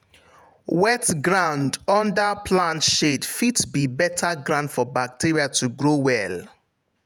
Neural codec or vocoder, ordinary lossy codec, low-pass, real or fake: vocoder, 48 kHz, 128 mel bands, Vocos; none; none; fake